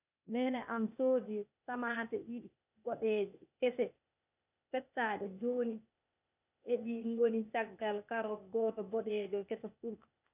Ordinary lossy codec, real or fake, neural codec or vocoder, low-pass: none; fake; codec, 16 kHz, 0.8 kbps, ZipCodec; 3.6 kHz